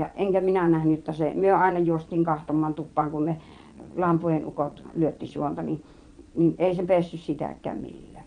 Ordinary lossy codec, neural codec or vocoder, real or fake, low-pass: none; vocoder, 22.05 kHz, 80 mel bands, Vocos; fake; 9.9 kHz